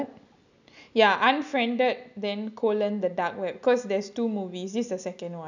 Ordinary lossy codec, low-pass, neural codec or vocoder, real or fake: none; 7.2 kHz; none; real